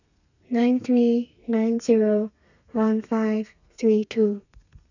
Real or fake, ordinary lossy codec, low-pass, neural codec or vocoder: fake; none; 7.2 kHz; codec, 32 kHz, 1.9 kbps, SNAC